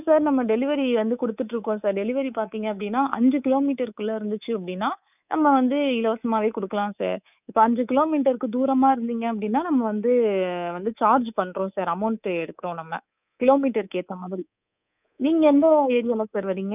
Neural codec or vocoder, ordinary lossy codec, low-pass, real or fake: codec, 24 kHz, 3.1 kbps, DualCodec; none; 3.6 kHz; fake